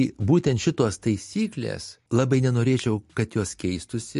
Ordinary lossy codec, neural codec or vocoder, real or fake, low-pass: MP3, 48 kbps; none; real; 14.4 kHz